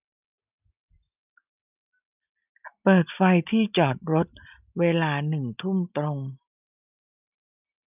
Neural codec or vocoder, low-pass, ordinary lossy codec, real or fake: none; 3.6 kHz; none; real